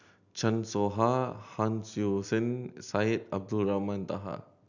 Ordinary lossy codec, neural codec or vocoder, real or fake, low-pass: none; none; real; 7.2 kHz